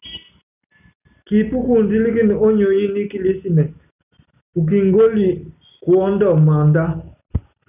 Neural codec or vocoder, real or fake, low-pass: none; real; 3.6 kHz